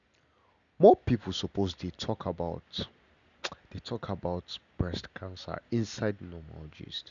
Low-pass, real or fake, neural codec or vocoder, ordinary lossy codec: 7.2 kHz; real; none; MP3, 96 kbps